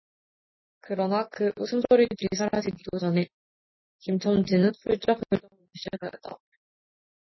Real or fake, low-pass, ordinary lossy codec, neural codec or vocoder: real; 7.2 kHz; MP3, 24 kbps; none